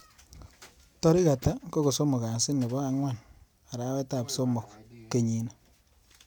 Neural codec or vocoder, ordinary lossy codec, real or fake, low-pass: none; none; real; none